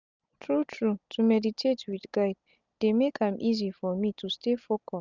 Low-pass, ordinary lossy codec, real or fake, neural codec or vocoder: 7.2 kHz; none; real; none